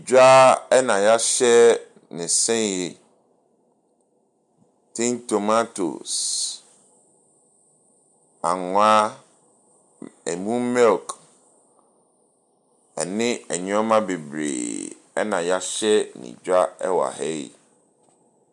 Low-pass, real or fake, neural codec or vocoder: 10.8 kHz; real; none